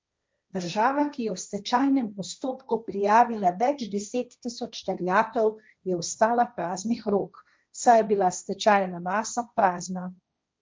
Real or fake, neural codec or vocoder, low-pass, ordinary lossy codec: fake; codec, 16 kHz, 1.1 kbps, Voila-Tokenizer; none; none